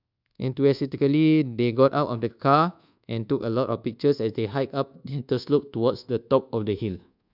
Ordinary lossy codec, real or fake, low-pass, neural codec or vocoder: none; fake; 5.4 kHz; codec, 24 kHz, 1.2 kbps, DualCodec